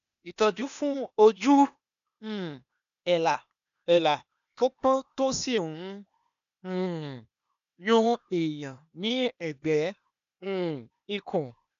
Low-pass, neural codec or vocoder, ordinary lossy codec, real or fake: 7.2 kHz; codec, 16 kHz, 0.8 kbps, ZipCodec; none; fake